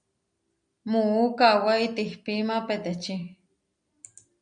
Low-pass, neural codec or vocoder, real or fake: 9.9 kHz; none; real